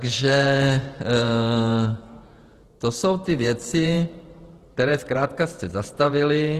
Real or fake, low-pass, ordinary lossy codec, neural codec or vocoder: fake; 14.4 kHz; Opus, 16 kbps; vocoder, 48 kHz, 128 mel bands, Vocos